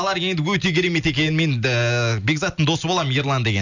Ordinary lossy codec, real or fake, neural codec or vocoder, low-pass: none; real; none; 7.2 kHz